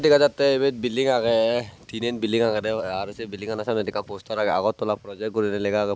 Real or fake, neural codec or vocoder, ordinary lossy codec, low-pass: real; none; none; none